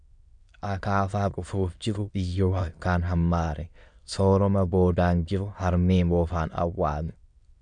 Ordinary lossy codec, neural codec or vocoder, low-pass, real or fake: MP3, 96 kbps; autoencoder, 22.05 kHz, a latent of 192 numbers a frame, VITS, trained on many speakers; 9.9 kHz; fake